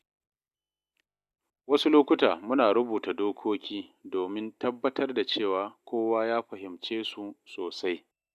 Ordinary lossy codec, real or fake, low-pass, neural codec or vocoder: none; real; 14.4 kHz; none